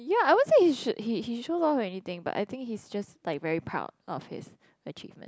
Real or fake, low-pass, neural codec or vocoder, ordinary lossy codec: real; none; none; none